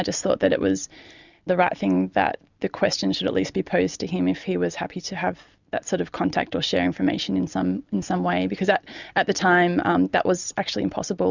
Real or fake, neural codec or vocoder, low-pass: real; none; 7.2 kHz